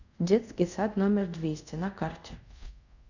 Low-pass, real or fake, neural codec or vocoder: 7.2 kHz; fake; codec, 24 kHz, 0.5 kbps, DualCodec